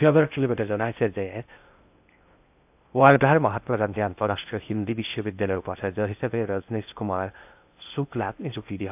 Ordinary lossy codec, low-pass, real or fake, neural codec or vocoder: AAC, 32 kbps; 3.6 kHz; fake; codec, 16 kHz in and 24 kHz out, 0.6 kbps, FocalCodec, streaming, 2048 codes